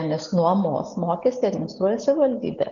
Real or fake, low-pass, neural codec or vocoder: real; 7.2 kHz; none